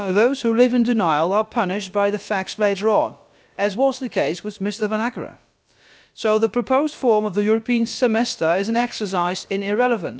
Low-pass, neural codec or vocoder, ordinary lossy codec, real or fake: none; codec, 16 kHz, about 1 kbps, DyCAST, with the encoder's durations; none; fake